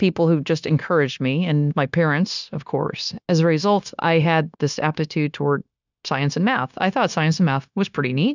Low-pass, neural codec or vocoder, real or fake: 7.2 kHz; codec, 16 kHz, 0.9 kbps, LongCat-Audio-Codec; fake